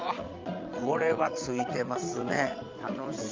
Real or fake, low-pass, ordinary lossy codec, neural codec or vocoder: fake; 7.2 kHz; Opus, 32 kbps; vocoder, 22.05 kHz, 80 mel bands, Vocos